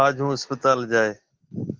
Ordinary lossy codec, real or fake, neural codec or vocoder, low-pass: Opus, 16 kbps; real; none; 7.2 kHz